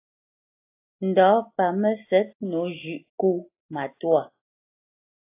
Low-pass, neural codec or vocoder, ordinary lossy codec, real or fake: 3.6 kHz; none; AAC, 24 kbps; real